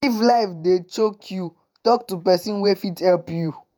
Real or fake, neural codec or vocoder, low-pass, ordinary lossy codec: fake; vocoder, 48 kHz, 128 mel bands, Vocos; none; none